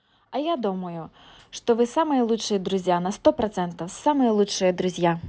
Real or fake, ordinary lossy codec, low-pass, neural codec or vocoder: real; none; none; none